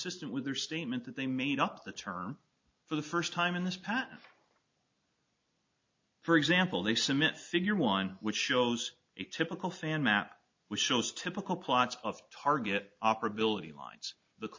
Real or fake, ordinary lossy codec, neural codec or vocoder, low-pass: real; MP3, 48 kbps; none; 7.2 kHz